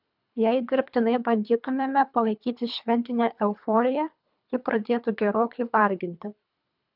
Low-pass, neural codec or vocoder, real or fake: 5.4 kHz; codec, 24 kHz, 3 kbps, HILCodec; fake